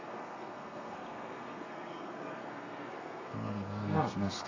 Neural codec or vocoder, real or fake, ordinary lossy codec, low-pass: codec, 32 kHz, 1.9 kbps, SNAC; fake; MP3, 48 kbps; 7.2 kHz